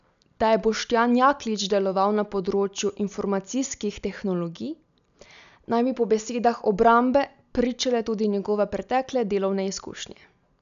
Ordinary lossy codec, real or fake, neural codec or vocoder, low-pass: none; real; none; 7.2 kHz